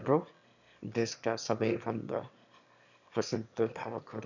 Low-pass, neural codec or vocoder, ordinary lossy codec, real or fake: 7.2 kHz; autoencoder, 22.05 kHz, a latent of 192 numbers a frame, VITS, trained on one speaker; none; fake